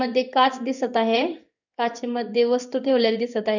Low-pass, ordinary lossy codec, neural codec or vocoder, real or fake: 7.2 kHz; none; codec, 16 kHz in and 24 kHz out, 1 kbps, XY-Tokenizer; fake